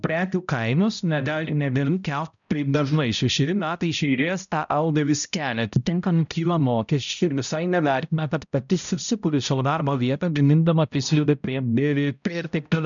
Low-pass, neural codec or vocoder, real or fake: 7.2 kHz; codec, 16 kHz, 0.5 kbps, X-Codec, HuBERT features, trained on balanced general audio; fake